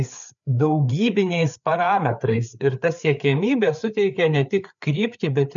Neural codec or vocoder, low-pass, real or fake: codec, 16 kHz, 4 kbps, FreqCodec, larger model; 7.2 kHz; fake